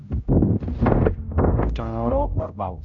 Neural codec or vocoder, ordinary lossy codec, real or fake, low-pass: codec, 16 kHz, 0.5 kbps, X-Codec, HuBERT features, trained on general audio; Opus, 64 kbps; fake; 7.2 kHz